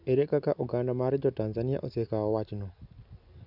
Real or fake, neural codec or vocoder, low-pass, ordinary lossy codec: real; none; 5.4 kHz; none